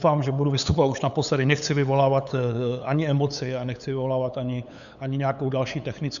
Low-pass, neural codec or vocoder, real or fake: 7.2 kHz; codec, 16 kHz, 8 kbps, FunCodec, trained on LibriTTS, 25 frames a second; fake